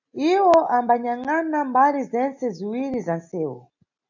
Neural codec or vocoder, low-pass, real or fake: none; 7.2 kHz; real